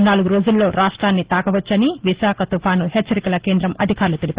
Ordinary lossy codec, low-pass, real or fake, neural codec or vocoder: Opus, 16 kbps; 3.6 kHz; real; none